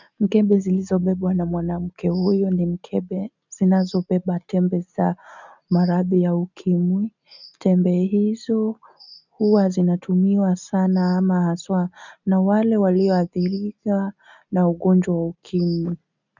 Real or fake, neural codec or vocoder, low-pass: real; none; 7.2 kHz